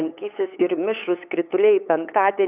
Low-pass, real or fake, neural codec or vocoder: 3.6 kHz; fake; codec, 16 kHz, 8 kbps, FunCodec, trained on LibriTTS, 25 frames a second